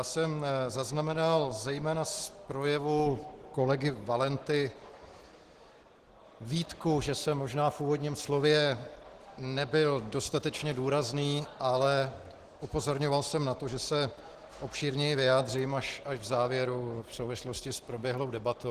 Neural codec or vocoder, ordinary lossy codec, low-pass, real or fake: none; Opus, 16 kbps; 14.4 kHz; real